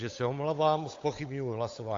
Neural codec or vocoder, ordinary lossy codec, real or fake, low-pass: codec, 16 kHz, 8 kbps, FunCodec, trained on Chinese and English, 25 frames a second; AAC, 48 kbps; fake; 7.2 kHz